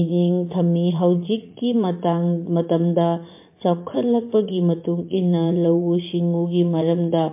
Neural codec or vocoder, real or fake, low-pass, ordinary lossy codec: vocoder, 44.1 kHz, 80 mel bands, Vocos; fake; 3.6 kHz; MP3, 24 kbps